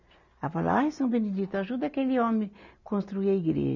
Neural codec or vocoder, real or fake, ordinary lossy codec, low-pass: none; real; none; 7.2 kHz